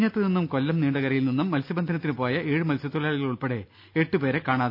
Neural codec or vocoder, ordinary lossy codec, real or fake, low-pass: none; none; real; 5.4 kHz